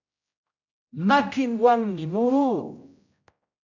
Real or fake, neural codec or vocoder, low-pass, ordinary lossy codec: fake; codec, 16 kHz, 0.5 kbps, X-Codec, HuBERT features, trained on general audio; 7.2 kHz; AAC, 48 kbps